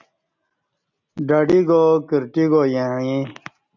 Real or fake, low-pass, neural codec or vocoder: real; 7.2 kHz; none